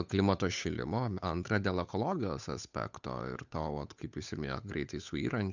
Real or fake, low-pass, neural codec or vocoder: fake; 7.2 kHz; codec, 16 kHz, 8 kbps, FunCodec, trained on Chinese and English, 25 frames a second